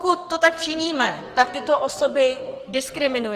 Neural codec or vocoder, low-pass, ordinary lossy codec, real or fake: codec, 44.1 kHz, 2.6 kbps, SNAC; 14.4 kHz; Opus, 24 kbps; fake